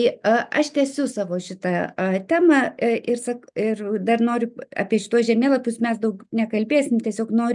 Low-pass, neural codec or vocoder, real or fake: 10.8 kHz; none; real